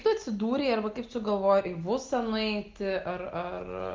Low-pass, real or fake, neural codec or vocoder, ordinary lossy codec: 7.2 kHz; real; none; Opus, 32 kbps